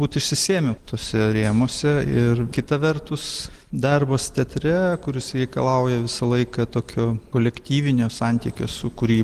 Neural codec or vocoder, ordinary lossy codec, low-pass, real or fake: none; Opus, 24 kbps; 14.4 kHz; real